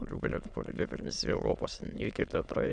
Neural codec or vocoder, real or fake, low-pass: autoencoder, 22.05 kHz, a latent of 192 numbers a frame, VITS, trained on many speakers; fake; 9.9 kHz